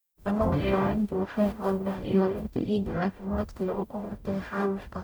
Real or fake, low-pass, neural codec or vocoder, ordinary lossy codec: fake; none; codec, 44.1 kHz, 0.9 kbps, DAC; none